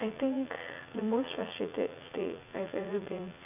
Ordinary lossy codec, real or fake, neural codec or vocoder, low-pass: none; fake; vocoder, 44.1 kHz, 80 mel bands, Vocos; 3.6 kHz